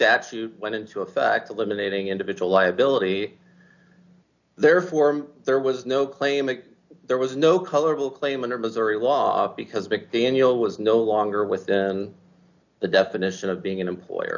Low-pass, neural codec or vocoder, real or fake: 7.2 kHz; none; real